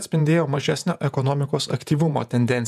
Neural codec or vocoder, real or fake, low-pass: vocoder, 48 kHz, 128 mel bands, Vocos; fake; 14.4 kHz